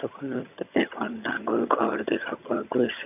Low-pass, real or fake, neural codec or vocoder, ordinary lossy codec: 3.6 kHz; fake; vocoder, 22.05 kHz, 80 mel bands, HiFi-GAN; none